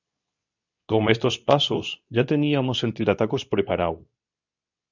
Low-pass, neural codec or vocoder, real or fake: 7.2 kHz; codec, 24 kHz, 0.9 kbps, WavTokenizer, medium speech release version 2; fake